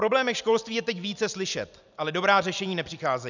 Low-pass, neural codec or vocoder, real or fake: 7.2 kHz; none; real